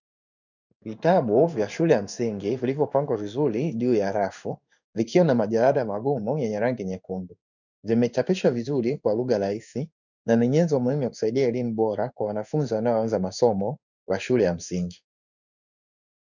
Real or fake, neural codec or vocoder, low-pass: fake; codec, 16 kHz in and 24 kHz out, 1 kbps, XY-Tokenizer; 7.2 kHz